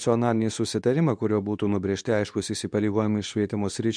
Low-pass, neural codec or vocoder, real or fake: 9.9 kHz; codec, 24 kHz, 0.9 kbps, WavTokenizer, medium speech release version 2; fake